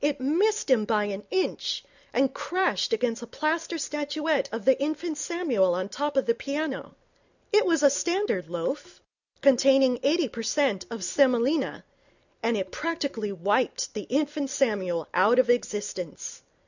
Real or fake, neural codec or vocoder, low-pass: real; none; 7.2 kHz